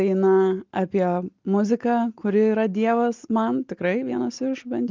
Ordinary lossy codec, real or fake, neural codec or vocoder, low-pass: Opus, 32 kbps; real; none; 7.2 kHz